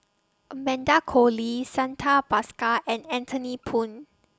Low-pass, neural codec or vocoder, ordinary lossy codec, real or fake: none; none; none; real